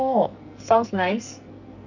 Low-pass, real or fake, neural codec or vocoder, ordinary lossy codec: 7.2 kHz; fake; codec, 44.1 kHz, 2.6 kbps, SNAC; none